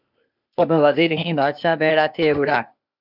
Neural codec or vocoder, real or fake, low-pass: codec, 16 kHz, 0.8 kbps, ZipCodec; fake; 5.4 kHz